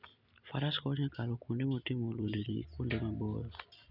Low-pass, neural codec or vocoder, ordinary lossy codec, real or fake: 5.4 kHz; none; none; real